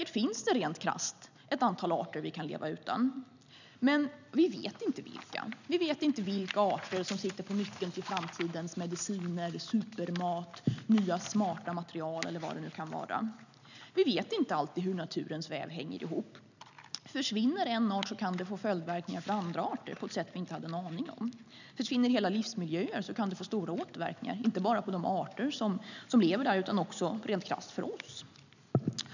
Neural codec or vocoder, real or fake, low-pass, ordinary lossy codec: none; real; 7.2 kHz; none